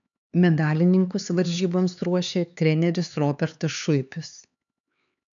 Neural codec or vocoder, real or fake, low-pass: codec, 16 kHz, 4 kbps, X-Codec, HuBERT features, trained on LibriSpeech; fake; 7.2 kHz